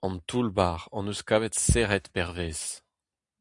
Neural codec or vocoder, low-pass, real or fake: none; 10.8 kHz; real